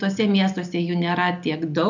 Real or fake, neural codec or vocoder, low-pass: real; none; 7.2 kHz